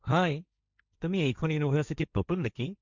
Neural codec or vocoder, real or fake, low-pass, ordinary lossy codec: codec, 16 kHz, 1.1 kbps, Voila-Tokenizer; fake; 7.2 kHz; Opus, 64 kbps